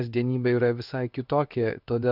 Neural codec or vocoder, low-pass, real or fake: codec, 16 kHz in and 24 kHz out, 1 kbps, XY-Tokenizer; 5.4 kHz; fake